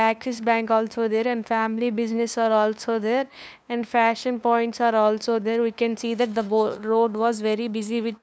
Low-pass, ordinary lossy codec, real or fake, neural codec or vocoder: none; none; fake; codec, 16 kHz, 2 kbps, FunCodec, trained on LibriTTS, 25 frames a second